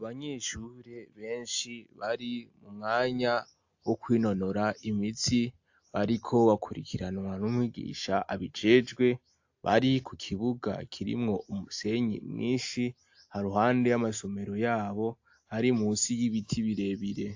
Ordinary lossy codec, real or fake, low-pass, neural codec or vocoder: AAC, 48 kbps; real; 7.2 kHz; none